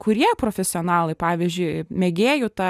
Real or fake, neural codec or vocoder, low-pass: real; none; 14.4 kHz